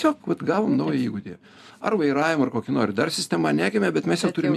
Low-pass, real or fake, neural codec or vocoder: 14.4 kHz; real; none